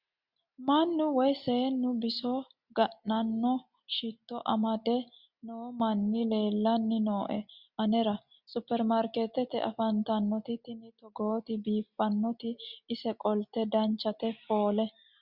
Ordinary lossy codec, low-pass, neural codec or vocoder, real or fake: Opus, 64 kbps; 5.4 kHz; none; real